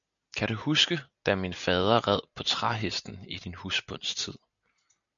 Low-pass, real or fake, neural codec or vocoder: 7.2 kHz; real; none